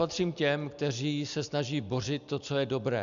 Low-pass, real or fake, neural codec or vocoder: 7.2 kHz; real; none